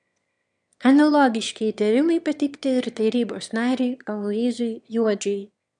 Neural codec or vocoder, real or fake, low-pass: autoencoder, 22.05 kHz, a latent of 192 numbers a frame, VITS, trained on one speaker; fake; 9.9 kHz